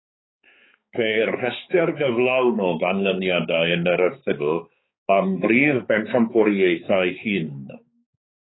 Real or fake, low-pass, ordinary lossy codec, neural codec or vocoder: fake; 7.2 kHz; AAC, 16 kbps; codec, 16 kHz, 4 kbps, X-Codec, HuBERT features, trained on balanced general audio